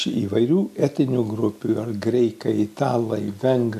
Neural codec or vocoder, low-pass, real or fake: none; 14.4 kHz; real